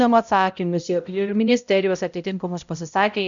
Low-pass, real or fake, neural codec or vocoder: 7.2 kHz; fake; codec, 16 kHz, 0.5 kbps, X-Codec, HuBERT features, trained on balanced general audio